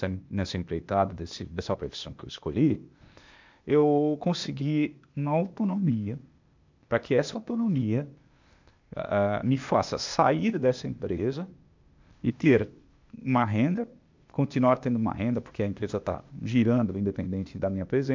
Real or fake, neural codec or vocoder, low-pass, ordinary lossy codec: fake; codec, 16 kHz, 0.8 kbps, ZipCodec; 7.2 kHz; MP3, 64 kbps